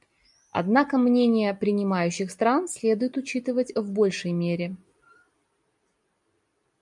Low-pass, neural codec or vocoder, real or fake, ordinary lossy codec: 10.8 kHz; none; real; MP3, 96 kbps